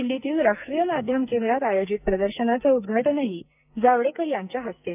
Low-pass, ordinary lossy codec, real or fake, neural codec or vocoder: 3.6 kHz; none; fake; codec, 44.1 kHz, 2.6 kbps, SNAC